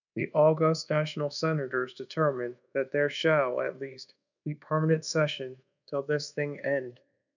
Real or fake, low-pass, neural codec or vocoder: fake; 7.2 kHz; codec, 24 kHz, 1.2 kbps, DualCodec